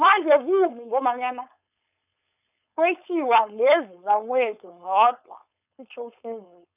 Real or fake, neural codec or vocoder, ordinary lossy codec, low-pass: fake; codec, 16 kHz, 4.8 kbps, FACodec; none; 3.6 kHz